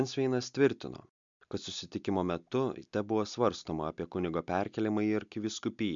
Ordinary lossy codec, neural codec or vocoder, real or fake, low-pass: MP3, 64 kbps; none; real; 7.2 kHz